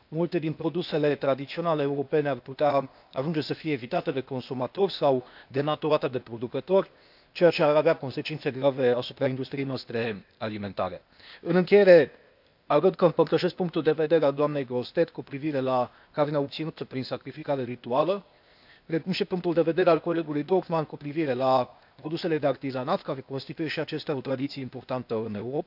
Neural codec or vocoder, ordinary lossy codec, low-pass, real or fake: codec, 16 kHz, 0.8 kbps, ZipCodec; none; 5.4 kHz; fake